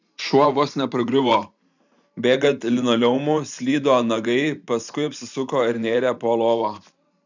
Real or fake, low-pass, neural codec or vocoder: fake; 7.2 kHz; vocoder, 44.1 kHz, 128 mel bands every 512 samples, BigVGAN v2